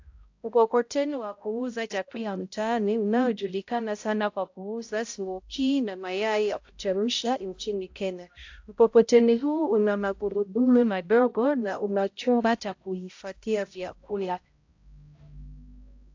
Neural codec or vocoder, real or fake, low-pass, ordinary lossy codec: codec, 16 kHz, 0.5 kbps, X-Codec, HuBERT features, trained on balanced general audio; fake; 7.2 kHz; AAC, 48 kbps